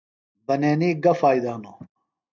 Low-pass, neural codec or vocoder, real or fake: 7.2 kHz; none; real